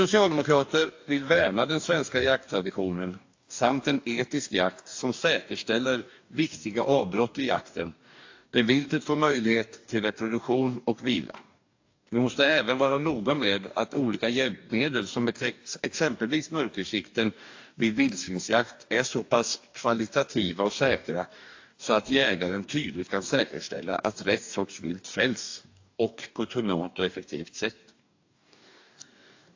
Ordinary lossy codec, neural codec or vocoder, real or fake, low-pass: AAC, 48 kbps; codec, 44.1 kHz, 2.6 kbps, DAC; fake; 7.2 kHz